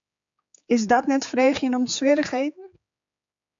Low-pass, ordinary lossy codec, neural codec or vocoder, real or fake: 7.2 kHz; MP3, 64 kbps; codec, 16 kHz, 4 kbps, X-Codec, HuBERT features, trained on general audio; fake